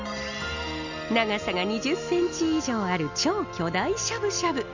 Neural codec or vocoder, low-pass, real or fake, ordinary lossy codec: none; 7.2 kHz; real; none